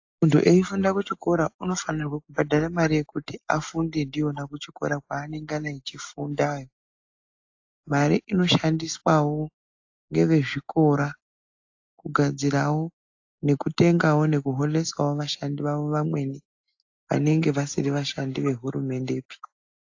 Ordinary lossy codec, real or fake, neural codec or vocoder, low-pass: AAC, 48 kbps; real; none; 7.2 kHz